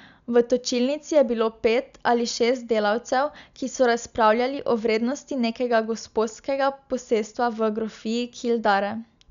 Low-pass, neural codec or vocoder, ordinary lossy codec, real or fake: 7.2 kHz; none; none; real